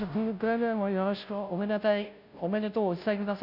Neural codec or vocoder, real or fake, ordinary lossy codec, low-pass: codec, 16 kHz, 0.5 kbps, FunCodec, trained on Chinese and English, 25 frames a second; fake; none; 5.4 kHz